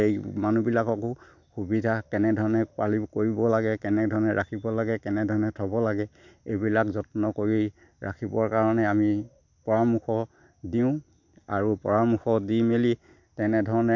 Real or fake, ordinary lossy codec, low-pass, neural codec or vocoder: real; none; 7.2 kHz; none